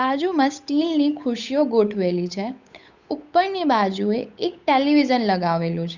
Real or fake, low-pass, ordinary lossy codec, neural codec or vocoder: fake; 7.2 kHz; Opus, 64 kbps; codec, 16 kHz, 8 kbps, FunCodec, trained on Chinese and English, 25 frames a second